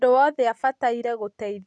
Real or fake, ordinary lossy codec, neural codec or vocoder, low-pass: real; none; none; none